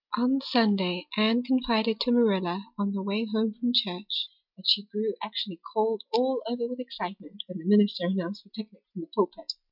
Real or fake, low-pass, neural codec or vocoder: real; 5.4 kHz; none